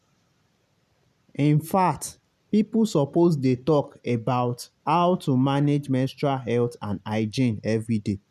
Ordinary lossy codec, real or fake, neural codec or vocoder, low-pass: none; real; none; 14.4 kHz